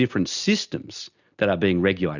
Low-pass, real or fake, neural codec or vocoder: 7.2 kHz; real; none